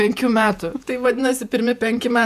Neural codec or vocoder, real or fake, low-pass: vocoder, 48 kHz, 128 mel bands, Vocos; fake; 14.4 kHz